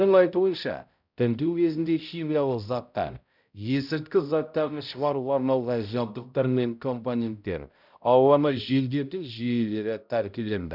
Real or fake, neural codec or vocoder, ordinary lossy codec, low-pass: fake; codec, 16 kHz, 0.5 kbps, X-Codec, HuBERT features, trained on balanced general audio; none; 5.4 kHz